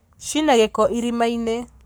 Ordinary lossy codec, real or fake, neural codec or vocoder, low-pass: none; fake; codec, 44.1 kHz, 7.8 kbps, Pupu-Codec; none